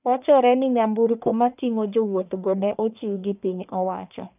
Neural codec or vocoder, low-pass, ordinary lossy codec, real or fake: codec, 44.1 kHz, 1.7 kbps, Pupu-Codec; 3.6 kHz; none; fake